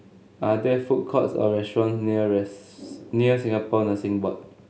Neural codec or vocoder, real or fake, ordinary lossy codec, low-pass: none; real; none; none